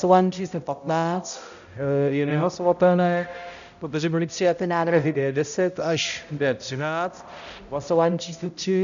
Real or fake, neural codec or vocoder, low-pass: fake; codec, 16 kHz, 0.5 kbps, X-Codec, HuBERT features, trained on balanced general audio; 7.2 kHz